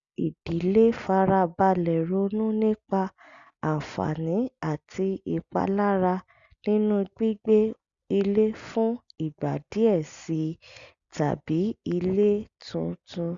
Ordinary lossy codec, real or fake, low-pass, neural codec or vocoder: Opus, 64 kbps; real; 7.2 kHz; none